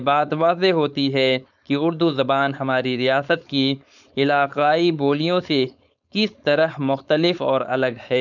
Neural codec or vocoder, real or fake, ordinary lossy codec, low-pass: codec, 16 kHz, 4.8 kbps, FACodec; fake; none; 7.2 kHz